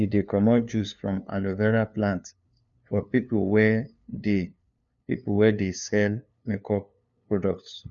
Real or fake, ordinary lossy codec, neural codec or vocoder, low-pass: fake; none; codec, 16 kHz, 2 kbps, FunCodec, trained on LibriTTS, 25 frames a second; 7.2 kHz